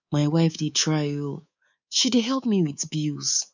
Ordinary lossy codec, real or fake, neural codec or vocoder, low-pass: none; fake; codec, 24 kHz, 3.1 kbps, DualCodec; 7.2 kHz